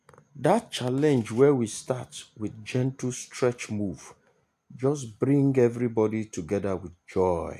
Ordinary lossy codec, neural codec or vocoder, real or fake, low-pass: AAC, 96 kbps; none; real; 14.4 kHz